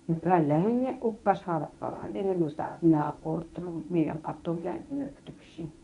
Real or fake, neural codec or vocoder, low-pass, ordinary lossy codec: fake; codec, 24 kHz, 0.9 kbps, WavTokenizer, medium speech release version 1; 10.8 kHz; none